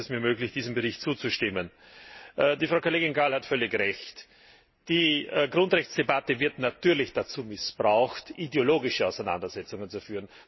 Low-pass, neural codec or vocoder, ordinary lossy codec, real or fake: 7.2 kHz; none; MP3, 24 kbps; real